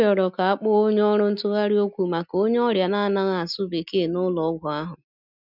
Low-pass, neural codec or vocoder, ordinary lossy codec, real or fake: 5.4 kHz; none; none; real